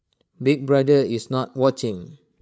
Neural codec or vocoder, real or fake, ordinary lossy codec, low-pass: codec, 16 kHz, 16 kbps, FreqCodec, larger model; fake; none; none